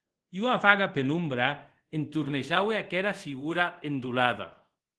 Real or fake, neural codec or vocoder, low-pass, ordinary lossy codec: fake; codec, 24 kHz, 0.5 kbps, DualCodec; 10.8 kHz; Opus, 16 kbps